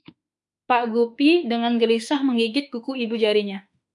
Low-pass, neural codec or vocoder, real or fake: 10.8 kHz; autoencoder, 48 kHz, 32 numbers a frame, DAC-VAE, trained on Japanese speech; fake